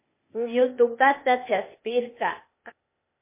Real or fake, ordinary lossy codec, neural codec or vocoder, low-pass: fake; MP3, 24 kbps; codec, 16 kHz, 0.8 kbps, ZipCodec; 3.6 kHz